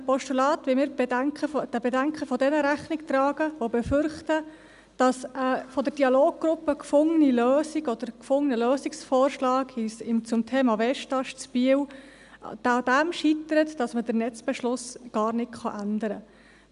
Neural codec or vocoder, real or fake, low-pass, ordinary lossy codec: none; real; 10.8 kHz; none